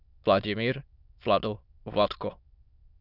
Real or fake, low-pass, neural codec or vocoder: fake; 5.4 kHz; autoencoder, 22.05 kHz, a latent of 192 numbers a frame, VITS, trained on many speakers